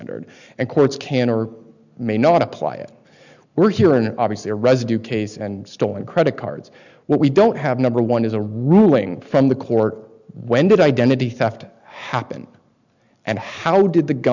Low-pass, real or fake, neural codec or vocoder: 7.2 kHz; real; none